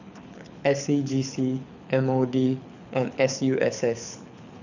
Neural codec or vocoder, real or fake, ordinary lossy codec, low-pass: codec, 24 kHz, 6 kbps, HILCodec; fake; none; 7.2 kHz